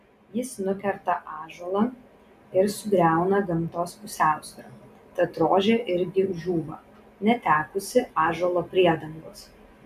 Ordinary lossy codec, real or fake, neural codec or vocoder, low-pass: AAC, 96 kbps; real; none; 14.4 kHz